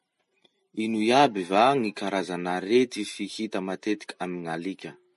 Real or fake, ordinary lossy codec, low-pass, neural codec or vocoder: real; MP3, 48 kbps; 14.4 kHz; none